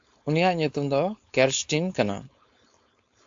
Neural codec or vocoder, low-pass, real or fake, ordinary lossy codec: codec, 16 kHz, 4.8 kbps, FACodec; 7.2 kHz; fake; AAC, 64 kbps